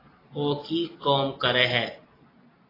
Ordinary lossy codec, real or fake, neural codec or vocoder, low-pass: AAC, 24 kbps; real; none; 5.4 kHz